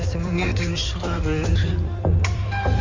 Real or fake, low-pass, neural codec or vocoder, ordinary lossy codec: fake; 7.2 kHz; codec, 16 kHz in and 24 kHz out, 2.2 kbps, FireRedTTS-2 codec; Opus, 32 kbps